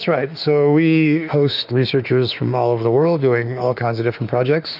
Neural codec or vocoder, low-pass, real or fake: autoencoder, 48 kHz, 32 numbers a frame, DAC-VAE, trained on Japanese speech; 5.4 kHz; fake